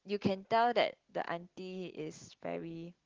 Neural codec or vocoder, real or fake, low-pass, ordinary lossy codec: none; real; 7.2 kHz; Opus, 16 kbps